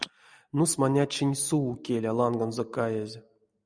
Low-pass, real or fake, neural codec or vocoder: 9.9 kHz; real; none